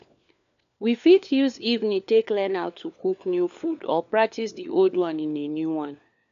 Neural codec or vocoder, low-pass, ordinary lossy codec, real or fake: codec, 16 kHz, 4 kbps, FunCodec, trained on LibriTTS, 50 frames a second; 7.2 kHz; none; fake